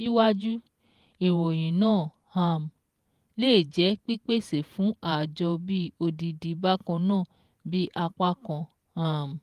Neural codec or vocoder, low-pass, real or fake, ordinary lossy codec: vocoder, 48 kHz, 128 mel bands, Vocos; 14.4 kHz; fake; Opus, 32 kbps